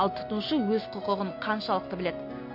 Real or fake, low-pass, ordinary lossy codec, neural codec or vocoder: real; 5.4 kHz; MP3, 32 kbps; none